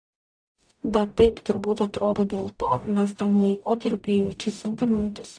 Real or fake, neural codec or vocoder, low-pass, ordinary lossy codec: fake; codec, 44.1 kHz, 0.9 kbps, DAC; 9.9 kHz; AAC, 64 kbps